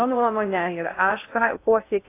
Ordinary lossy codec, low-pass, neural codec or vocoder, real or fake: AAC, 24 kbps; 3.6 kHz; codec, 16 kHz in and 24 kHz out, 0.6 kbps, FocalCodec, streaming, 2048 codes; fake